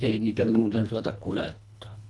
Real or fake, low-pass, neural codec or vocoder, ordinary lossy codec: fake; none; codec, 24 kHz, 1.5 kbps, HILCodec; none